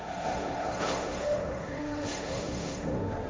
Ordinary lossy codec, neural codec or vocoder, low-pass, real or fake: none; codec, 16 kHz, 1.1 kbps, Voila-Tokenizer; none; fake